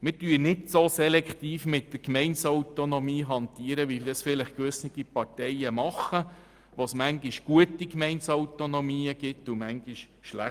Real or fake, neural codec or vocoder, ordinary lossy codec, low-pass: real; none; Opus, 32 kbps; 14.4 kHz